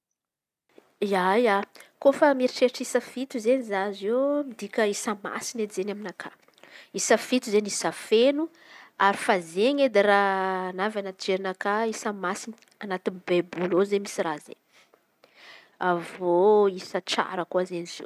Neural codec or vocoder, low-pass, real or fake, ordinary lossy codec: none; 14.4 kHz; real; none